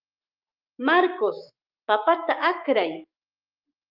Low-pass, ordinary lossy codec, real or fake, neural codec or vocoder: 5.4 kHz; Opus, 32 kbps; fake; autoencoder, 48 kHz, 128 numbers a frame, DAC-VAE, trained on Japanese speech